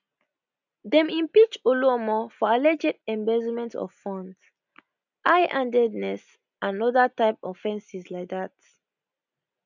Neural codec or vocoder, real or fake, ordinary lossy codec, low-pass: none; real; none; 7.2 kHz